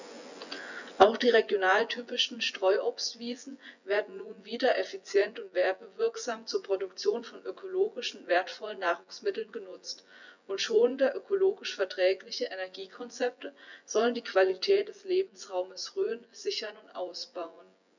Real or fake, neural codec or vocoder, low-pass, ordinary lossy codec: fake; vocoder, 24 kHz, 100 mel bands, Vocos; 7.2 kHz; none